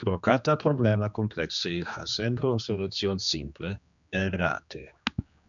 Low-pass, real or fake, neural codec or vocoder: 7.2 kHz; fake; codec, 16 kHz, 2 kbps, X-Codec, HuBERT features, trained on general audio